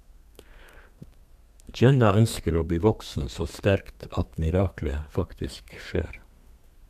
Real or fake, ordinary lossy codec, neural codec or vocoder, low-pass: fake; none; codec, 32 kHz, 1.9 kbps, SNAC; 14.4 kHz